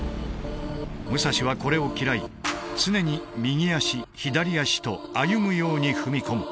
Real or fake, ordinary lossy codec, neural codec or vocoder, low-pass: real; none; none; none